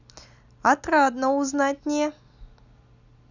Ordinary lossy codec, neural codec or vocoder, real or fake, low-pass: none; none; real; 7.2 kHz